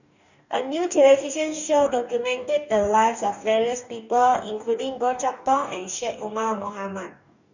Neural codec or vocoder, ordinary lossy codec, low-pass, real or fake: codec, 44.1 kHz, 2.6 kbps, DAC; none; 7.2 kHz; fake